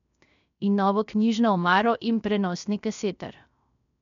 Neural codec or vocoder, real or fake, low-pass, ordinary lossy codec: codec, 16 kHz, 0.3 kbps, FocalCodec; fake; 7.2 kHz; none